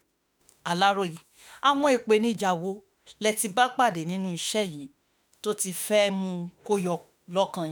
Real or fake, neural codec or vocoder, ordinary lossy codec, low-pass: fake; autoencoder, 48 kHz, 32 numbers a frame, DAC-VAE, trained on Japanese speech; none; none